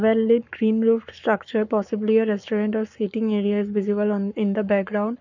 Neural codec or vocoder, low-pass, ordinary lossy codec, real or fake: codec, 44.1 kHz, 7.8 kbps, Pupu-Codec; 7.2 kHz; none; fake